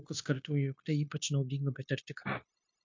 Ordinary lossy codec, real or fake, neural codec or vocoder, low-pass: MP3, 48 kbps; fake; codec, 16 kHz, 0.9 kbps, LongCat-Audio-Codec; 7.2 kHz